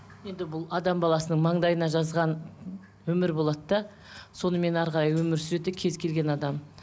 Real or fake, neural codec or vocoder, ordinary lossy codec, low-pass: real; none; none; none